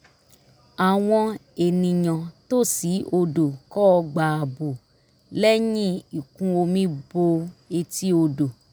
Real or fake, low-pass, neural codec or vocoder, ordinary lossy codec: real; none; none; none